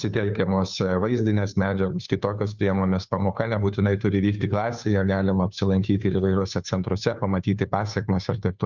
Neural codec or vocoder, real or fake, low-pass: codec, 16 kHz, 2 kbps, FunCodec, trained on Chinese and English, 25 frames a second; fake; 7.2 kHz